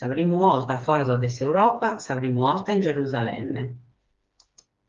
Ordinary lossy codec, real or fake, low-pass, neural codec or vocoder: Opus, 32 kbps; fake; 7.2 kHz; codec, 16 kHz, 2 kbps, FreqCodec, smaller model